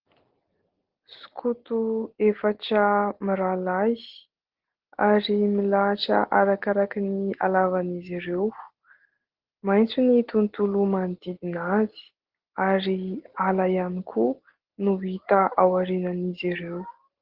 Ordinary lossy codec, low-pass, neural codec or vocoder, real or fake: Opus, 16 kbps; 5.4 kHz; none; real